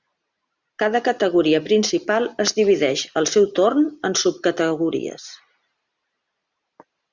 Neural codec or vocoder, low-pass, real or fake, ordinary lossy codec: none; 7.2 kHz; real; Opus, 64 kbps